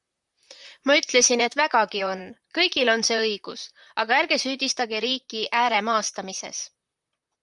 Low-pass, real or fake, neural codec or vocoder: 10.8 kHz; fake; vocoder, 44.1 kHz, 128 mel bands, Pupu-Vocoder